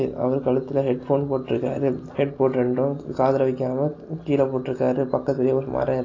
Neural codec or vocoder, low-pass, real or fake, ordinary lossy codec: none; 7.2 kHz; real; AAC, 32 kbps